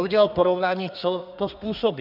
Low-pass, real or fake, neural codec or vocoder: 5.4 kHz; fake; codec, 32 kHz, 1.9 kbps, SNAC